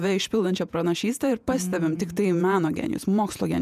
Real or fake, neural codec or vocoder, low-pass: fake; vocoder, 48 kHz, 128 mel bands, Vocos; 14.4 kHz